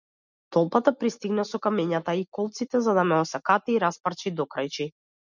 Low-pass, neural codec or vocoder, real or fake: 7.2 kHz; none; real